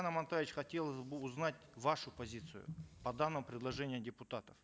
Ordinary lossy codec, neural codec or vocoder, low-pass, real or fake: none; none; none; real